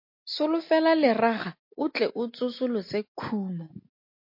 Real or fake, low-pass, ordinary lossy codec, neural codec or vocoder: real; 5.4 kHz; MP3, 32 kbps; none